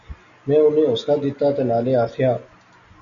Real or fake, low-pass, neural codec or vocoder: real; 7.2 kHz; none